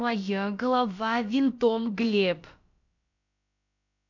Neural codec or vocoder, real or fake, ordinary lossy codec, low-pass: codec, 16 kHz, about 1 kbps, DyCAST, with the encoder's durations; fake; none; 7.2 kHz